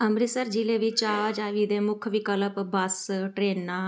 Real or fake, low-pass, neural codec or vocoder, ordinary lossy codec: real; none; none; none